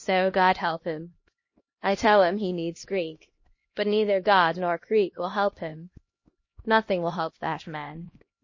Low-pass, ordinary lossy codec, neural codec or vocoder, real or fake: 7.2 kHz; MP3, 32 kbps; codec, 16 kHz, 1 kbps, X-Codec, HuBERT features, trained on LibriSpeech; fake